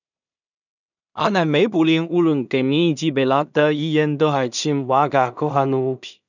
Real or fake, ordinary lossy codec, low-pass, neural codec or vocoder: fake; none; 7.2 kHz; codec, 16 kHz in and 24 kHz out, 0.4 kbps, LongCat-Audio-Codec, two codebook decoder